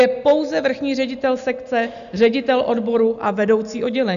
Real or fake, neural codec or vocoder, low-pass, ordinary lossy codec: real; none; 7.2 kHz; AAC, 96 kbps